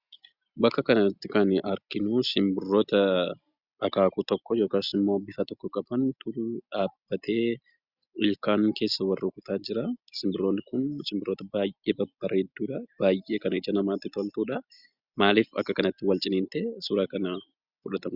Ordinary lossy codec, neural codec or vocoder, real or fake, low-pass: Opus, 64 kbps; none; real; 5.4 kHz